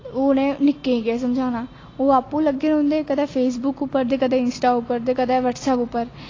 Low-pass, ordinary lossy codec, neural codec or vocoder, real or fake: 7.2 kHz; AAC, 32 kbps; none; real